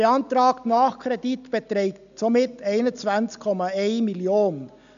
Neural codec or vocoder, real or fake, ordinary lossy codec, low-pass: none; real; none; 7.2 kHz